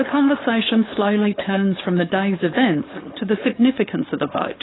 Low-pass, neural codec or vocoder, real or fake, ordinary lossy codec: 7.2 kHz; codec, 16 kHz, 4.8 kbps, FACodec; fake; AAC, 16 kbps